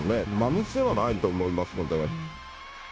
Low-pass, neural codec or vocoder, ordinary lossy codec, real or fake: none; codec, 16 kHz, 0.9 kbps, LongCat-Audio-Codec; none; fake